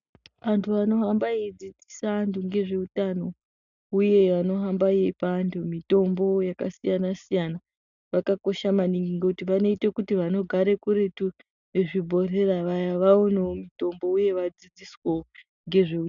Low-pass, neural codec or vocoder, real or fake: 7.2 kHz; none; real